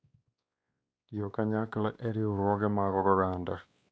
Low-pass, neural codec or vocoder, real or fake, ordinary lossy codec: none; codec, 16 kHz, 2 kbps, X-Codec, WavLM features, trained on Multilingual LibriSpeech; fake; none